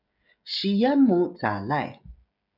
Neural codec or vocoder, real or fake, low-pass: codec, 16 kHz, 16 kbps, FreqCodec, smaller model; fake; 5.4 kHz